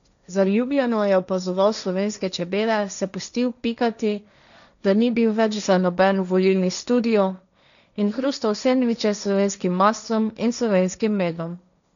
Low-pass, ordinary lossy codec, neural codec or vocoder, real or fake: 7.2 kHz; none; codec, 16 kHz, 1.1 kbps, Voila-Tokenizer; fake